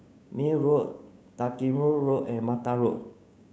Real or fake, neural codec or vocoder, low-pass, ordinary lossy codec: fake; codec, 16 kHz, 6 kbps, DAC; none; none